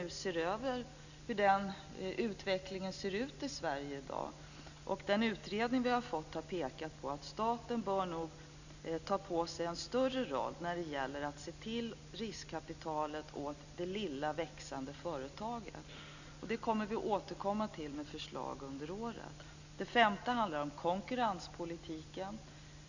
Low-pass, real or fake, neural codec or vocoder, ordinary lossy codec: 7.2 kHz; real; none; none